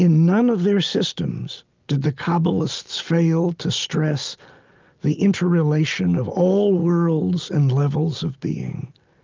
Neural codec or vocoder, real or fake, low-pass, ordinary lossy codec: none; real; 7.2 kHz; Opus, 24 kbps